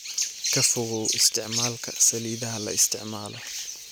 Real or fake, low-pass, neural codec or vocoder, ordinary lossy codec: real; none; none; none